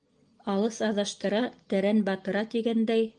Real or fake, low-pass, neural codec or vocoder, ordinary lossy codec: real; 10.8 kHz; none; Opus, 24 kbps